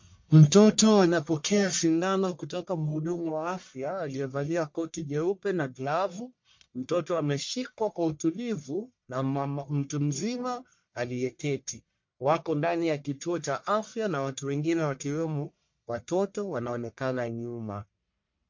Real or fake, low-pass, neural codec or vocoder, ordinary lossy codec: fake; 7.2 kHz; codec, 44.1 kHz, 1.7 kbps, Pupu-Codec; MP3, 48 kbps